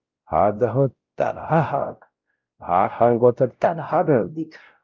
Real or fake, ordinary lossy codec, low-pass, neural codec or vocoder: fake; Opus, 24 kbps; 7.2 kHz; codec, 16 kHz, 0.5 kbps, X-Codec, WavLM features, trained on Multilingual LibriSpeech